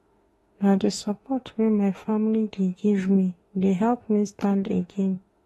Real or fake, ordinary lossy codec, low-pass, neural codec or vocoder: fake; AAC, 32 kbps; 19.8 kHz; autoencoder, 48 kHz, 32 numbers a frame, DAC-VAE, trained on Japanese speech